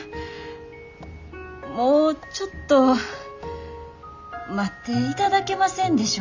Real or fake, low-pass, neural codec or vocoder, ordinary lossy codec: real; 7.2 kHz; none; Opus, 64 kbps